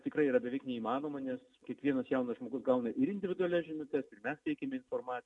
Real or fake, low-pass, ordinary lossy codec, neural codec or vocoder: real; 10.8 kHz; MP3, 64 kbps; none